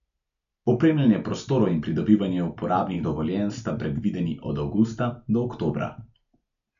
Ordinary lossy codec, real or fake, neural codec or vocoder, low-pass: AAC, 96 kbps; real; none; 7.2 kHz